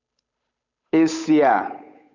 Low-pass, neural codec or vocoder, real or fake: 7.2 kHz; codec, 16 kHz, 8 kbps, FunCodec, trained on Chinese and English, 25 frames a second; fake